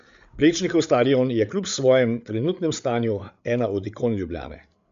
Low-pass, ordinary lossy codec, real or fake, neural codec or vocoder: 7.2 kHz; MP3, 64 kbps; fake; codec, 16 kHz, 8 kbps, FreqCodec, larger model